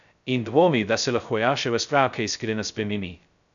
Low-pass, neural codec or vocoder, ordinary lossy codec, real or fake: 7.2 kHz; codec, 16 kHz, 0.2 kbps, FocalCodec; none; fake